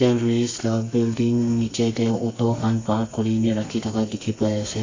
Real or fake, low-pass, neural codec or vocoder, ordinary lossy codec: fake; 7.2 kHz; codec, 32 kHz, 1.9 kbps, SNAC; AAC, 32 kbps